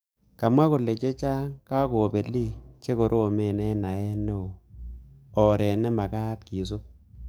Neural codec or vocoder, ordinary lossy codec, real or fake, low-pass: codec, 44.1 kHz, 7.8 kbps, DAC; none; fake; none